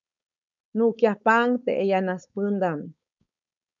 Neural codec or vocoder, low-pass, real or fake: codec, 16 kHz, 4.8 kbps, FACodec; 7.2 kHz; fake